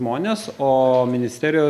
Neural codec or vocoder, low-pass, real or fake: none; 14.4 kHz; real